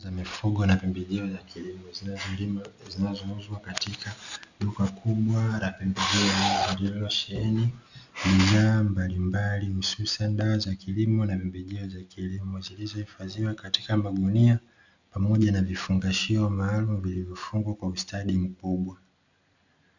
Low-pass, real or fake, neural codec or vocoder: 7.2 kHz; real; none